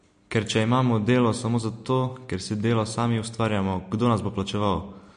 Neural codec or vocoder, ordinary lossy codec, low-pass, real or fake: none; MP3, 48 kbps; 9.9 kHz; real